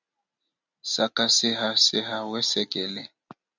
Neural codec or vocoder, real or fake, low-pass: none; real; 7.2 kHz